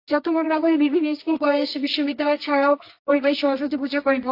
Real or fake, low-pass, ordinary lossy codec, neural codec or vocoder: fake; 5.4 kHz; AAC, 32 kbps; codec, 24 kHz, 0.9 kbps, WavTokenizer, medium music audio release